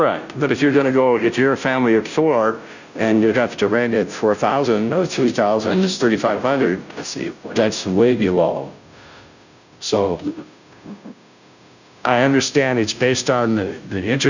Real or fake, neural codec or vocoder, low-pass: fake; codec, 16 kHz, 0.5 kbps, FunCodec, trained on Chinese and English, 25 frames a second; 7.2 kHz